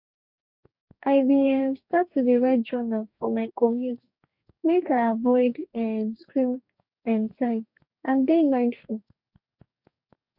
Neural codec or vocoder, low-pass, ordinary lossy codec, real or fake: codec, 44.1 kHz, 2.6 kbps, DAC; 5.4 kHz; none; fake